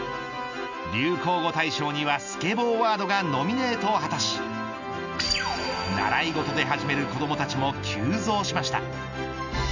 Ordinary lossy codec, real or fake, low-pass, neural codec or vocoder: none; real; 7.2 kHz; none